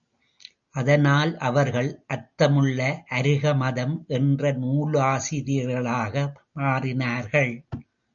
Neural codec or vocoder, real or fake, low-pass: none; real; 7.2 kHz